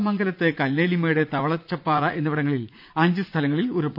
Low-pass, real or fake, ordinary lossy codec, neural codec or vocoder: 5.4 kHz; fake; none; vocoder, 44.1 kHz, 80 mel bands, Vocos